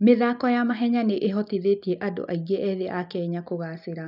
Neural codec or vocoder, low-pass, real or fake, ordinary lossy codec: none; 5.4 kHz; real; none